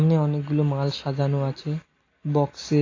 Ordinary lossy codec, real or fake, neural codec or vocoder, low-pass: AAC, 32 kbps; real; none; 7.2 kHz